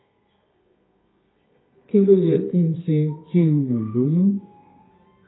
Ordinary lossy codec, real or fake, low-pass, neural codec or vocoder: AAC, 16 kbps; fake; 7.2 kHz; codec, 24 kHz, 0.9 kbps, WavTokenizer, medium music audio release